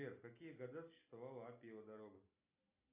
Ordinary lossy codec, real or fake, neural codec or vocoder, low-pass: AAC, 32 kbps; real; none; 3.6 kHz